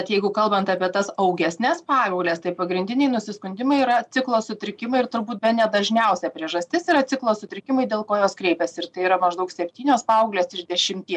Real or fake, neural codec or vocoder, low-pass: real; none; 10.8 kHz